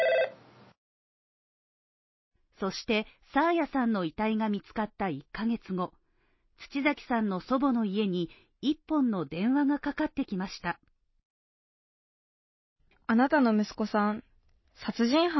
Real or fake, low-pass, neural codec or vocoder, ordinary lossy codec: real; 7.2 kHz; none; MP3, 24 kbps